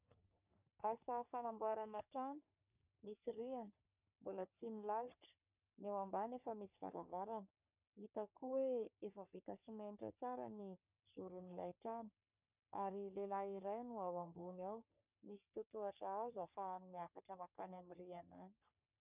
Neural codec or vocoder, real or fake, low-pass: codec, 44.1 kHz, 3.4 kbps, Pupu-Codec; fake; 3.6 kHz